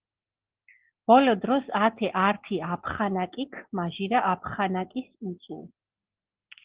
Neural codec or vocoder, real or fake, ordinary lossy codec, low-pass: none; real; Opus, 16 kbps; 3.6 kHz